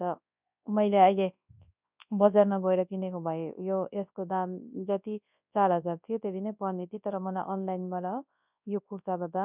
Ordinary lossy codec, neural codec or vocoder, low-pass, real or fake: none; codec, 24 kHz, 0.9 kbps, WavTokenizer, large speech release; 3.6 kHz; fake